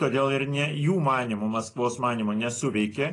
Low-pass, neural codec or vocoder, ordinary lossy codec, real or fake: 10.8 kHz; none; AAC, 32 kbps; real